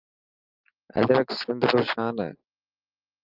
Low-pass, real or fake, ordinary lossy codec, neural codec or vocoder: 5.4 kHz; real; Opus, 24 kbps; none